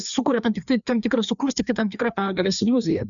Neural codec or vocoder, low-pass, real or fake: codec, 16 kHz, 2 kbps, FreqCodec, larger model; 7.2 kHz; fake